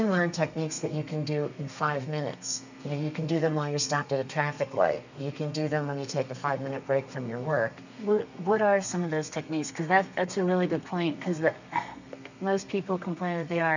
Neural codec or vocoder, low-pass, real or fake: codec, 32 kHz, 1.9 kbps, SNAC; 7.2 kHz; fake